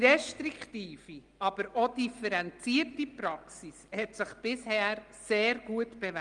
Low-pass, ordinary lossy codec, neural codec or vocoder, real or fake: 9.9 kHz; Opus, 24 kbps; none; real